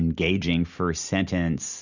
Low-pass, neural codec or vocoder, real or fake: 7.2 kHz; none; real